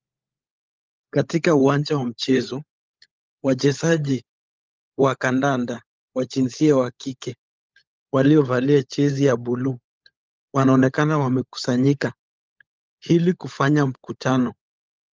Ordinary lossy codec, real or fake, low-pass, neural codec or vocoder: Opus, 32 kbps; fake; 7.2 kHz; codec, 16 kHz, 16 kbps, FunCodec, trained on LibriTTS, 50 frames a second